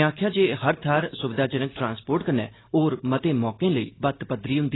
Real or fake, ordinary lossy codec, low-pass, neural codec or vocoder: real; AAC, 16 kbps; 7.2 kHz; none